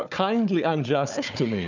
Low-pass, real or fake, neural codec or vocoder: 7.2 kHz; fake; codec, 16 kHz, 4 kbps, FunCodec, trained on Chinese and English, 50 frames a second